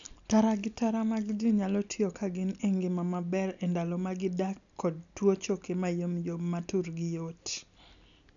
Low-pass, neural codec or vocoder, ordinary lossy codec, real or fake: 7.2 kHz; none; none; real